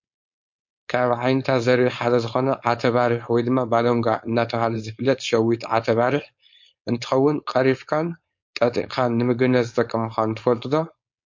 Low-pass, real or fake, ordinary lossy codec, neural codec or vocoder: 7.2 kHz; fake; MP3, 48 kbps; codec, 16 kHz, 4.8 kbps, FACodec